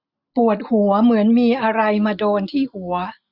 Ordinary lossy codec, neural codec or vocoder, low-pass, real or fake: Opus, 64 kbps; none; 5.4 kHz; real